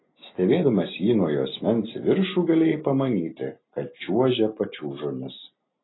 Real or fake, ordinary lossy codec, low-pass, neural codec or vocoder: real; AAC, 16 kbps; 7.2 kHz; none